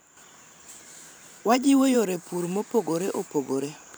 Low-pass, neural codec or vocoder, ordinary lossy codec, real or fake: none; vocoder, 44.1 kHz, 128 mel bands every 512 samples, BigVGAN v2; none; fake